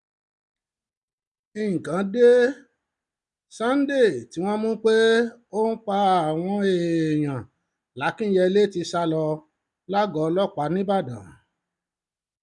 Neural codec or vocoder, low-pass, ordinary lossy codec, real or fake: none; 10.8 kHz; none; real